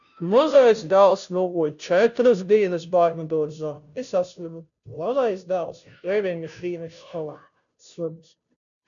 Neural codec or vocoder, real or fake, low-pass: codec, 16 kHz, 0.5 kbps, FunCodec, trained on Chinese and English, 25 frames a second; fake; 7.2 kHz